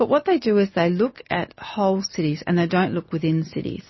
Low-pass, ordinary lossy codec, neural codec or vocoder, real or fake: 7.2 kHz; MP3, 24 kbps; none; real